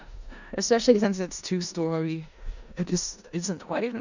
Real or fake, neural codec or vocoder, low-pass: fake; codec, 16 kHz in and 24 kHz out, 0.4 kbps, LongCat-Audio-Codec, four codebook decoder; 7.2 kHz